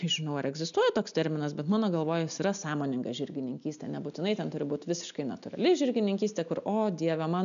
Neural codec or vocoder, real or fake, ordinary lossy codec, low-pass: none; real; MP3, 96 kbps; 7.2 kHz